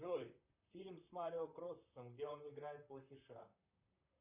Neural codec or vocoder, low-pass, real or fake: vocoder, 44.1 kHz, 128 mel bands, Pupu-Vocoder; 3.6 kHz; fake